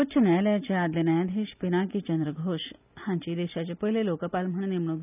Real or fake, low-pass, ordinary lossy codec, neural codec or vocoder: real; 3.6 kHz; none; none